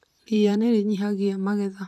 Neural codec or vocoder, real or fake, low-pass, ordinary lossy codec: vocoder, 44.1 kHz, 128 mel bands, Pupu-Vocoder; fake; 14.4 kHz; none